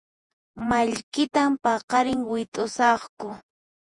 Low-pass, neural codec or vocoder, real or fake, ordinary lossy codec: 10.8 kHz; vocoder, 48 kHz, 128 mel bands, Vocos; fake; Opus, 64 kbps